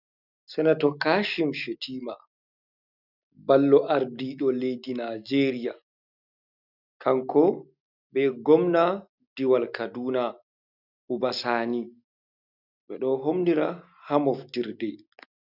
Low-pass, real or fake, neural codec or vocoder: 5.4 kHz; real; none